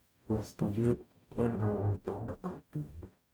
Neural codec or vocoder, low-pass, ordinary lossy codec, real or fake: codec, 44.1 kHz, 0.9 kbps, DAC; none; none; fake